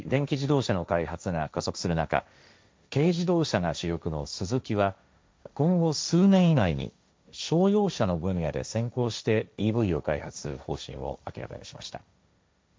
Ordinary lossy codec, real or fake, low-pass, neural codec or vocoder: none; fake; none; codec, 16 kHz, 1.1 kbps, Voila-Tokenizer